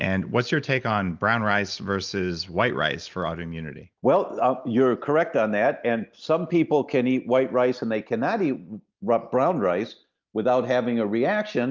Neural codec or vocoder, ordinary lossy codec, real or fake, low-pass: none; Opus, 24 kbps; real; 7.2 kHz